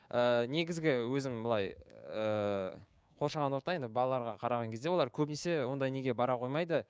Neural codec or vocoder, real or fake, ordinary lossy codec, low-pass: codec, 16 kHz, 6 kbps, DAC; fake; none; none